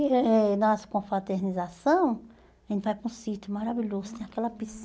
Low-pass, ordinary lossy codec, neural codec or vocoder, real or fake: none; none; none; real